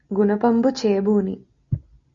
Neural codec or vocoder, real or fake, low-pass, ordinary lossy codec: none; real; 7.2 kHz; Opus, 64 kbps